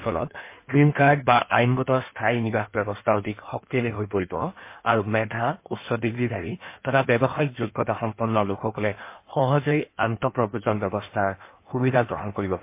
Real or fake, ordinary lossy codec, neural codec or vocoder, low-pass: fake; MP3, 24 kbps; codec, 16 kHz in and 24 kHz out, 1.1 kbps, FireRedTTS-2 codec; 3.6 kHz